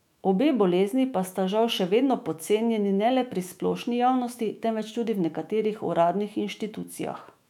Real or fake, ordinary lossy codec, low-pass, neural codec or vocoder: fake; none; 19.8 kHz; autoencoder, 48 kHz, 128 numbers a frame, DAC-VAE, trained on Japanese speech